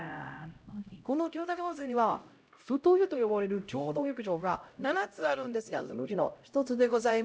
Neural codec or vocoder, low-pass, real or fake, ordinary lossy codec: codec, 16 kHz, 0.5 kbps, X-Codec, HuBERT features, trained on LibriSpeech; none; fake; none